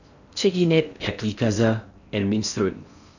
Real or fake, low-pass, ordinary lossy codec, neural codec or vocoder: fake; 7.2 kHz; none; codec, 16 kHz in and 24 kHz out, 0.6 kbps, FocalCodec, streaming, 4096 codes